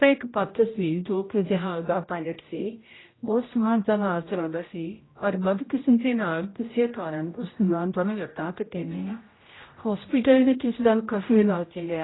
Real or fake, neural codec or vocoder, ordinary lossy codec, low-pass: fake; codec, 16 kHz, 0.5 kbps, X-Codec, HuBERT features, trained on general audio; AAC, 16 kbps; 7.2 kHz